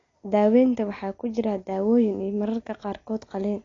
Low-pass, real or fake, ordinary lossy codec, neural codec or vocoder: 7.2 kHz; real; AAC, 32 kbps; none